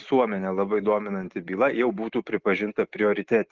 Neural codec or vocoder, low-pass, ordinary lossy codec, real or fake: none; 7.2 kHz; Opus, 16 kbps; real